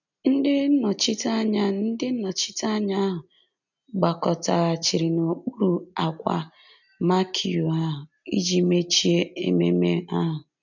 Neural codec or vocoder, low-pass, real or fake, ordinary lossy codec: none; 7.2 kHz; real; none